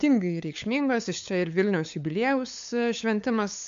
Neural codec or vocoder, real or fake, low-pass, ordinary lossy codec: codec, 16 kHz, 8 kbps, FunCodec, trained on LibriTTS, 25 frames a second; fake; 7.2 kHz; AAC, 64 kbps